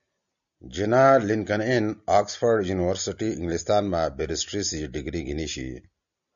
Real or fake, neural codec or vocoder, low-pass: real; none; 7.2 kHz